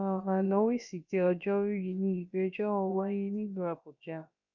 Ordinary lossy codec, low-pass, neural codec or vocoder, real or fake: none; 7.2 kHz; codec, 16 kHz, about 1 kbps, DyCAST, with the encoder's durations; fake